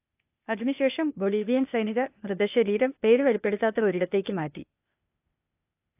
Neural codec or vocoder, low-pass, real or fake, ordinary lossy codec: codec, 16 kHz, 0.8 kbps, ZipCodec; 3.6 kHz; fake; AAC, 32 kbps